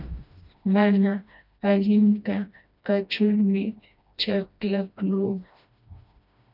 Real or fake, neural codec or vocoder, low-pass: fake; codec, 16 kHz, 1 kbps, FreqCodec, smaller model; 5.4 kHz